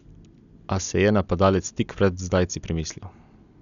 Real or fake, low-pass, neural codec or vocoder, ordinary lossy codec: real; 7.2 kHz; none; none